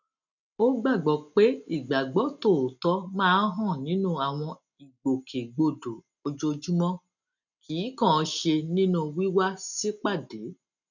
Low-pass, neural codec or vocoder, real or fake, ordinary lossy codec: 7.2 kHz; none; real; none